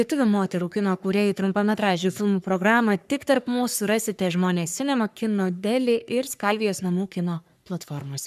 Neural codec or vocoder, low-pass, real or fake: codec, 44.1 kHz, 3.4 kbps, Pupu-Codec; 14.4 kHz; fake